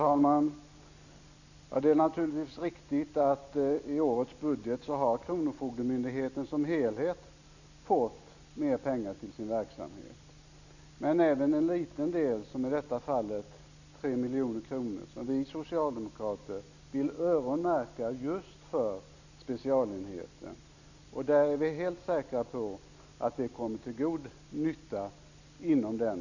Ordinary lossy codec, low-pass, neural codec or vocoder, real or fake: none; 7.2 kHz; none; real